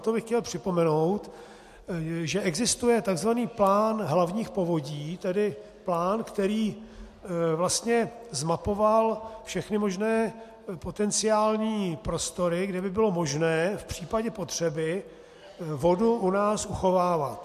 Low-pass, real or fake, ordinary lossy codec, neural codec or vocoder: 14.4 kHz; real; MP3, 64 kbps; none